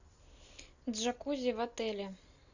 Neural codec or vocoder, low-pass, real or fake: none; 7.2 kHz; real